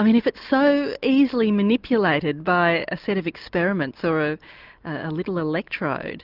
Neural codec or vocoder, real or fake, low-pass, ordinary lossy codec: none; real; 5.4 kHz; Opus, 24 kbps